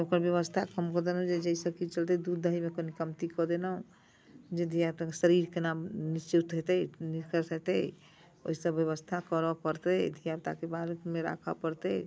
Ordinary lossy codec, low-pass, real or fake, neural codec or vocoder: none; none; real; none